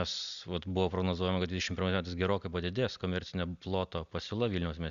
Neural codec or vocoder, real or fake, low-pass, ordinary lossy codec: none; real; 7.2 kHz; Opus, 64 kbps